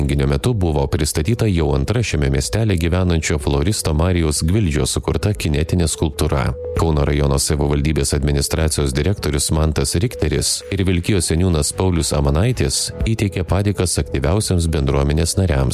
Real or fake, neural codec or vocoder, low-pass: real; none; 14.4 kHz